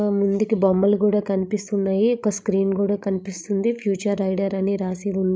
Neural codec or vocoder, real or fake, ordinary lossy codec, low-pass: codec, 16 kHz, 16 kbps, FreqCodec, larger model; fake; none; none